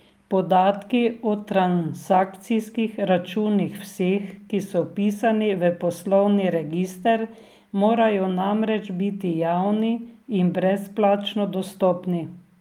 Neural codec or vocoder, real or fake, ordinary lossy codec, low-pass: none; real; Opus, 32 kbps; 19.8 kHz